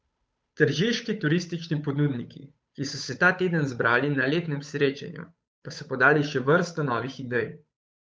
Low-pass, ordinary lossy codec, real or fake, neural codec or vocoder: none; none; fake; codec, 16 kHz, 8 kbps, FunCodec, trained on Chinese and English, 25 frames a second